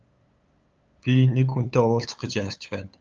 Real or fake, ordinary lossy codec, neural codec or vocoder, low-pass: fake; Opus, 24 kbps; codec, 16 kHz, 8 kbps, FunCodec, trained on LibriTTS, 25 frames a second; 7.2 kHz